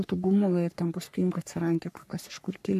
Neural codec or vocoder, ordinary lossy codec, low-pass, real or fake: codec, 44.1 kHz, 3.4 kbps, Pupu-Codec; AAC, 96 kbps; 14.4 kHz; fake